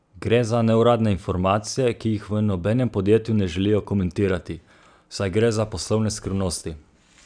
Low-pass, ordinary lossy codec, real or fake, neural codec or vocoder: 9.9 kHz; none; real; none